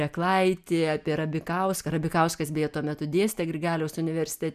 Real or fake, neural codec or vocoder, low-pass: real; none; 14.4 kHz